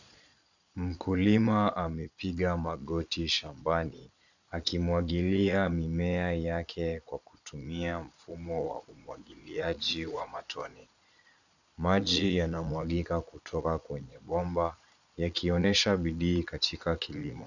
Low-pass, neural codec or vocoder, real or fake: 7.2 kHz; vocoder, 22.05 kHz, 80 mel bands, Vocos; fake